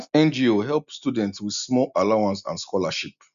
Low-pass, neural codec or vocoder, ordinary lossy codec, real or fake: 7.2 kHz; none; none; real